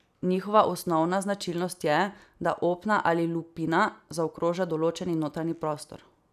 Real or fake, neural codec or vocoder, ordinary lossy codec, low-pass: real; none; none; 14.4 kHz